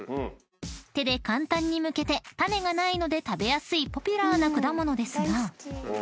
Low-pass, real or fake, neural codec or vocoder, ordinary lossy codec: none; real; none; none